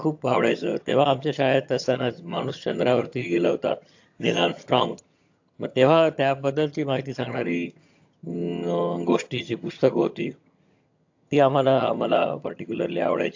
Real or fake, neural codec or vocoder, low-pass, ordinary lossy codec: fake; vocoder, 22.05 kHz, 80 mel bands, HiFi-GAN; 7.2 kHz; AAC, 48 kbps